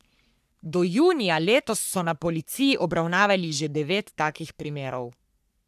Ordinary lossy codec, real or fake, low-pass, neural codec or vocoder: none; fake; 14.4 kHz; codec, 44.1 kHz, 3.4 kbps, Pupu-Codec